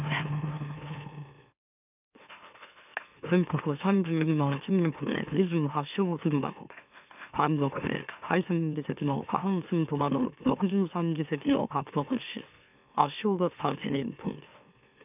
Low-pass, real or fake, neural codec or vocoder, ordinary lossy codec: 3.6 kHz; fake; autoencoder, 44.1 kHz, a latent of 192 numbers a frame, MeloTTS; none